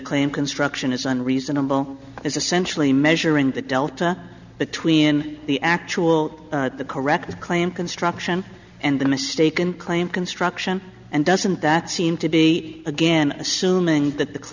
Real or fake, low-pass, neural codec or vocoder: real; 7.2 kHz; none